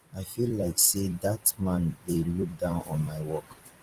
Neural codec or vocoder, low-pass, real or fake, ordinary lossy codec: vocoder, 44.1 kHz, 128 mel bands every 256 samples, BigVGAN v2; 14.4 kHz; fake; Opus, 24 kbps